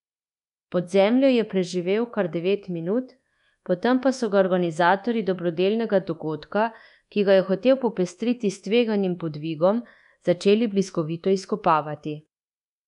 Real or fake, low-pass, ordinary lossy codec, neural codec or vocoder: fake; 10.8 kHz; MP3, 96 kbps; codec, 24 kHz, 1.2 kbps, DualCodec